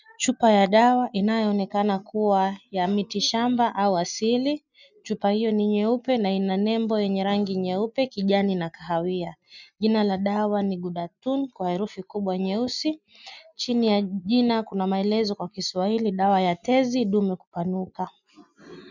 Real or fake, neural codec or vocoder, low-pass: real; none; 7.2 kHz